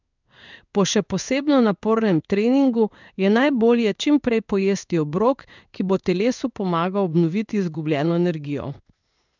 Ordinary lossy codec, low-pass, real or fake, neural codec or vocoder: none; 7.2 kHz; fake; codec, 16 kHz in and 24 kHz out, 1 kbps, XY-Tokenizer